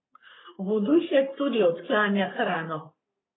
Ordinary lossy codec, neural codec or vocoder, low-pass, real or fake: AAC, 16 kbps; codec, 44.1 kHz, 3.4 kbps, Pupu-Codec; 7.2 kHz; fake